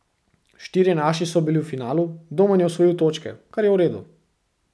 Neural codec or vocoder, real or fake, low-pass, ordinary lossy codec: none; real; none; none